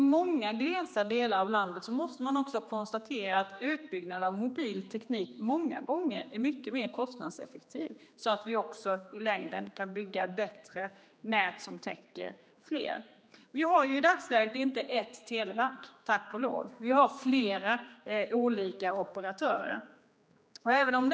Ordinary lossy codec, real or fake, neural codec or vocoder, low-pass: none; fake; codec, 16 kHz, 2 kbps, X-Codec, HuBERT features, trained on general audio; none